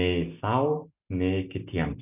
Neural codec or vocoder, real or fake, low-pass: none; real; 3.6 kHz